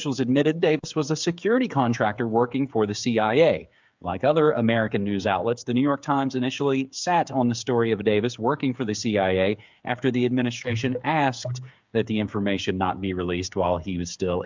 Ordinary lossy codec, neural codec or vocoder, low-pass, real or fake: MP3, 64 kbps; codec, 16 kHz, 8 kbps, FreqCodec, smaller model; 7.2 kHz; fake